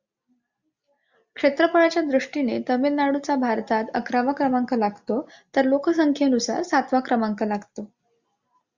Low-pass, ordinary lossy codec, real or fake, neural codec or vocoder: 7.2 kHz; Opus, 64 kbps; real; none